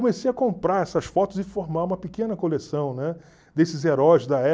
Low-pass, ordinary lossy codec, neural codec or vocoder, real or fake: none; none; none; real